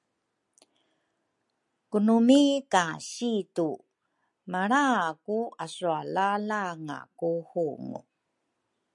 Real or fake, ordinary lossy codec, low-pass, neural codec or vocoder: real; MP3, 96 kbps; 10.8 kHz; none